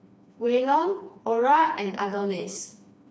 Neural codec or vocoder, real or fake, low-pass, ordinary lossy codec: codec, 16 kHz, 2 kbps, FreqCodec, smaller model; fake; none; none